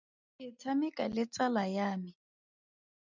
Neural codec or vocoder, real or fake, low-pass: none; real; 7.2 kHz